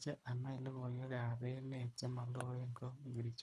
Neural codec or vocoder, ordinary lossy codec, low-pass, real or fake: codec, 24 kHz, 3 kbps, HILCodec; none; none; fake